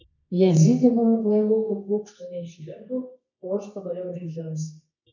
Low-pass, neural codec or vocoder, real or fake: 7.2 kHz; codec, 24 kHz, 0.9 kbps, WavTokenizer, medium music audio release; fake